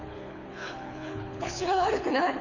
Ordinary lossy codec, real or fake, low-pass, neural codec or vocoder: Opus, 64 kbps; fake; 7.2 kHz; codec, 24 kHz, 6 kbps, HILCodec